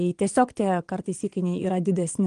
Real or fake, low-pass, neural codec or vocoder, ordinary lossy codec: fake; 9.9 kHz; vocoder, 22.05 kHz, 80 mel bands, Vocos; Opus, 24 kbps